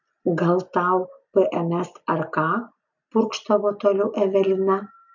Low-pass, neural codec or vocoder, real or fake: 7.2 kHz; none; real